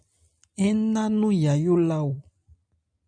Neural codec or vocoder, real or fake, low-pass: none; real; 9.9 kHz